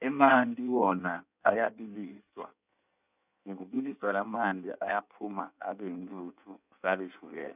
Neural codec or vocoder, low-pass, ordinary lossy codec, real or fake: codec, 16 kHz in and 24 kHz out, 1.1 kbps, FireRedTTS-2 codec; 3.6 kHz; none; fake